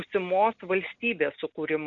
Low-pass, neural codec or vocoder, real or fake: 7.2 kHz; none; real